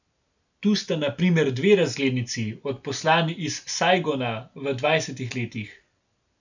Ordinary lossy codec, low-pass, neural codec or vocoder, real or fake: none; 7.2 kHz; none; real